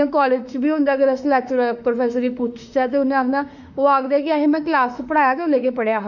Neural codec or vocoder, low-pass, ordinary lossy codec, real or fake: autoencoder, 48 kHz, 32 numbers a frame, DAC-VAE, trained on Japanese speech; 7.2 kHz; none; fake